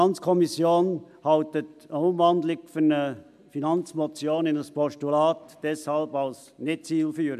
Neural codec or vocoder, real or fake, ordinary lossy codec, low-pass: none; real; MP3, 96 kbps; 14.4 kHz